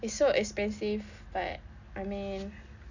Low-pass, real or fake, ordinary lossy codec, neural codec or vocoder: 7.2 kHz; real; none; none